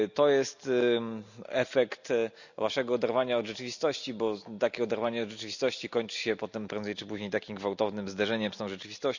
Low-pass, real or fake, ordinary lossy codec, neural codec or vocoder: 7.2 kHz; real; none; none